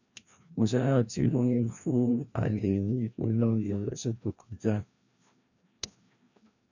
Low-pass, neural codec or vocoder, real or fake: 7.2 kHz; codec, 16 kHz, 1 kbps, FreqCodec, larger model; fake